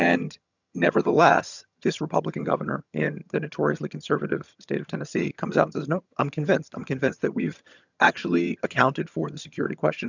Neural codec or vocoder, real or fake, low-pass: vocoder, 22.05 kHz, 80 mel bands, HiFi-GAN; fake; 7.2 kHz